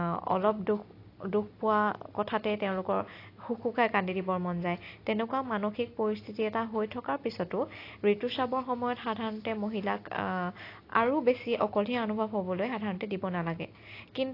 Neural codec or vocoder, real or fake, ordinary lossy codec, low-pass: none; real; AAC, 32 kbps; 5.4 kHz